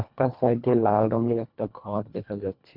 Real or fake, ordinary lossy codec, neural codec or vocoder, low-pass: fake; MP3, 48 kbps; codec, 24 kHz, 1.5 kbps, HILCodec; 5.4 kHz